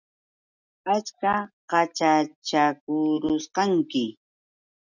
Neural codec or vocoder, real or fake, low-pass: none; real; 7.2 kHz